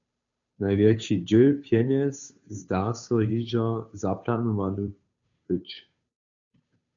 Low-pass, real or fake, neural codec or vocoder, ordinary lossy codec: 7.2 kHz; fake; codec, 16 kHz, 2 kbps, FunCodec, trained on Chinese and English, 25 frames a second; MP3, 64 kbps